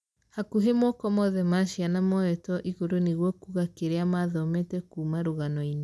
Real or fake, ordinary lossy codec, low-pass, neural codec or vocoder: real; none; none; none